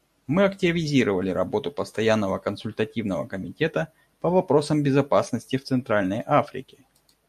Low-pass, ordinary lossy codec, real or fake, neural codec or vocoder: 14.4 kHz; MP3, 64 kbps; real; none